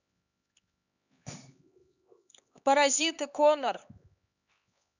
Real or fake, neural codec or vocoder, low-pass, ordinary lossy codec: fake; codec, 16 kHz, 4 kbps, X-Codec, HuBERT features, trained on LibriSpeech; 7.2 kHz; none